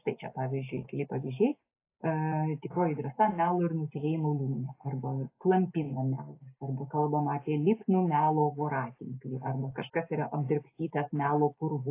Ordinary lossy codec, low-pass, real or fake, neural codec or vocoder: AAC, 24 kbps; 3.6 kHz; real; none